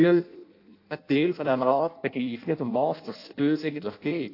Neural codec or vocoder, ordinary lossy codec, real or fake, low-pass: codec, 16 kHz in and 24 kHz out, 0.6 kbps, FireRedTTS-2 codec; AAC, 32 kbps; fake; 5.4 kHz